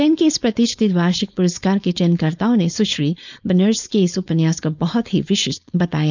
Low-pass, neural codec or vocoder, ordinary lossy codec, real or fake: 7.2 kHz; codec, 16 kHz, 4.8 kbps, FACodec; none; fake